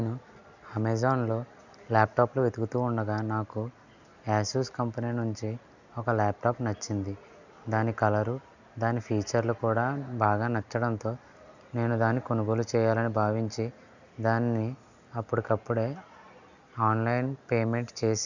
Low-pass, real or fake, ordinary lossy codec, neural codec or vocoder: 7.2 kHz; real; none; none